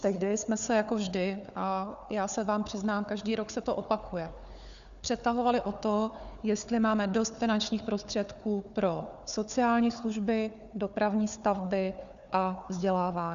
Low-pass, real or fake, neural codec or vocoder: 7.2 kHz; fake; codec, 16 kHz, 4 kbps, FunCodec, trained on Chinese and English, 50 frames a second